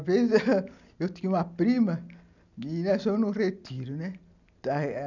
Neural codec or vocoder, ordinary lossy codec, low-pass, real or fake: none; none; 7.2 kHz; real